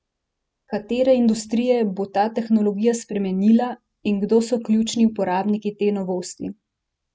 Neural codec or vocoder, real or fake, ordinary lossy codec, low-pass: none; real; none; none